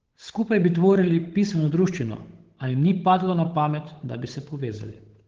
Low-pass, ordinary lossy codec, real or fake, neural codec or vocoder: 7.2 kHz; Opus, 16 kbps; fake; codec, 16 kHz, 8 kbps, FunCodec, trained on Chinese and English, 25 frames a second